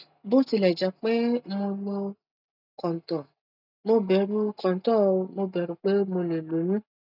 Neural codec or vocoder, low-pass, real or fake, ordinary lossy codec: none; 5.4 kHz; real; none